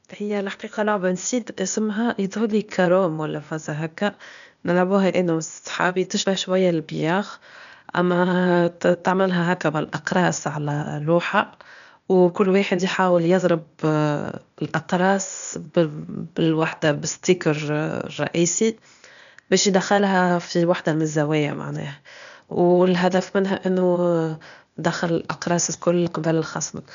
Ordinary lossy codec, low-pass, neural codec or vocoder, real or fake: none; 7.2 kHz; codec, 16 kHz, 0.8 kbps, ZipCodec; fake